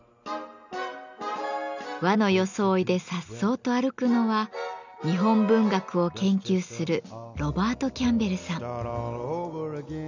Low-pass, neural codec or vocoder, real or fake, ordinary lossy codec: 7.2 kHz; none; real; none